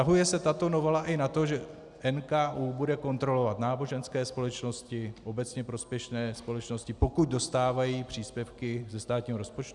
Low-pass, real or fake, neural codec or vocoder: 10.8 kHz; real; none